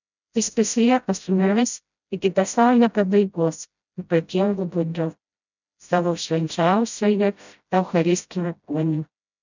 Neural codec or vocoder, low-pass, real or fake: codec, 16 kHz, 0.5 kbps, FreqCodec, smaller model; 7.2 kHz; fake